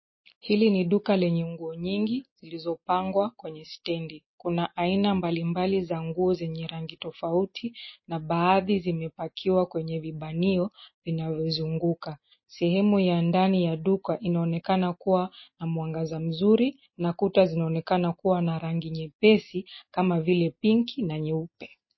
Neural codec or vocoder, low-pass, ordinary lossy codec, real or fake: none; 7.2 kHz; MP3, 24 kbps; real